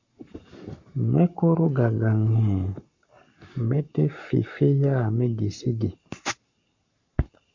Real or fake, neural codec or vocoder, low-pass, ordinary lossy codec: fake; codec, 44.1 kHz, 7.8 kbps, Pupu-Codec; 7.2 kHz; MP3, 48 kbps